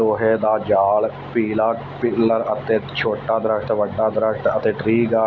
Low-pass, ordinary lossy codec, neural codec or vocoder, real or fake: 7.2 kHz; MP3, 48 kbps; none; real